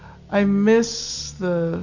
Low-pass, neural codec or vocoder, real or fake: 7.2 kHz; none; real